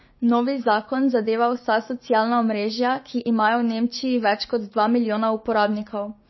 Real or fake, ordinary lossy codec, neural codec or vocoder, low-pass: fake; MP3, 24 kbps; codec, 16 kHz, 6 kbps, DAC; 7.2 kHz